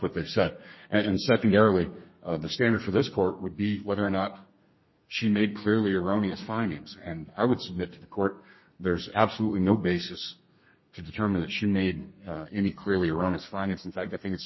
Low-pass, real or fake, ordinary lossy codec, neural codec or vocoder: 7.2 kHz; fake; MP3, 24 kbps; codec, 32 kHz, 1.9 kbps, SNAC